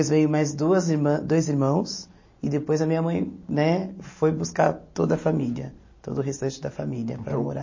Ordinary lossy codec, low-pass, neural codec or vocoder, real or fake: MP3, 32 kbps; 7.2 kHz; none; real